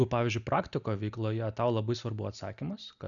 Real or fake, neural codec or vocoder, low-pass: real; none; 7.2 kHz